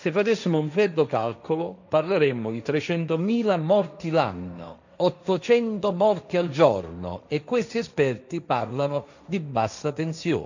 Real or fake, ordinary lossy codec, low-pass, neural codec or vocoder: fake; none; 7.2 kHz; codec, 16 kHz, 1.1 kbps, Voila-Tokenizer